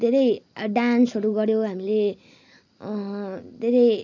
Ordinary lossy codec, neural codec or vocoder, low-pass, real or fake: none; none; 7.2 kHz; real